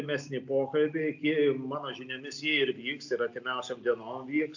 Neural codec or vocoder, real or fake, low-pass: none; real; 7.2 kHz